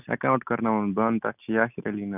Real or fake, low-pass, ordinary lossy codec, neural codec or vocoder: real; 3.6 kHz; none; none